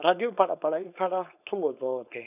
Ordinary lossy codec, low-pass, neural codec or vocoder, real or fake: none; 3.6 kHz; codec, 16 kHz, 4.8 kbps, FACodec; fake